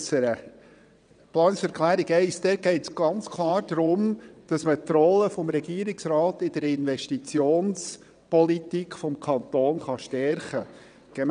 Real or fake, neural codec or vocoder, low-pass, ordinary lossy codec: fake; vocoder, 22.05 kHz, 80 mel bands, WaveNeXt; 9.9 kHz; none